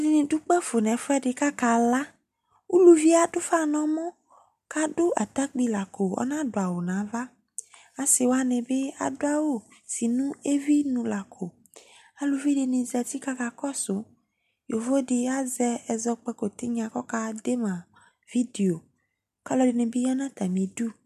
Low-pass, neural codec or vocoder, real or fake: 14.4 kHz; none; real